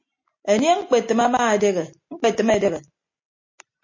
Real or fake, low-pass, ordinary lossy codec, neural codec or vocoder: real; 7.2 kHz; MP3, 32 kbps; none